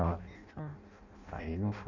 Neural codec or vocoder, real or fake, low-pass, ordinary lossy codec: codec, 16 kHz in and 24 kHz out, 0.6 kbps, FireRedTTS-2 codec; fake; 7.2 kHz; none